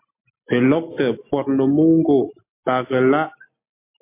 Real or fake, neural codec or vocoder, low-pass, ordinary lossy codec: real; none; 3.6 kHz; MP3, 24 kbps